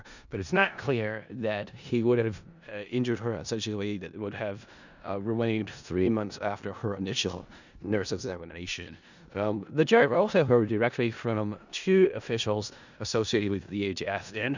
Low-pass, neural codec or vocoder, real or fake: 7.2 kHz; codec, 16 kHz in and 24 kHz out, 0.4 kbps, LongCat-Audio-Codec, four codebook decoder; fake